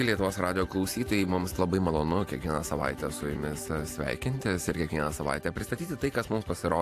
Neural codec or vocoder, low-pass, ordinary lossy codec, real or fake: vocoder, 44.1 kHz, 128 mel bands every 512 samples, BigVGAN v2; 14.4 kHz; AAC, 64 kbps; fake